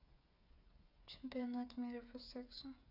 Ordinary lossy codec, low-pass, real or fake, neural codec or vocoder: none; 5.4 kHz; fake; codec, 16 kHz, 8 kbps, FreqCodec, smaller model